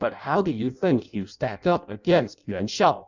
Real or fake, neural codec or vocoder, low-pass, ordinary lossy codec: fake; codec, 16 kHz in and 24 kHz out, 0.6 kbps, FireRedTTS-2 codec; 7.2 kHz; Opus, 64 kbps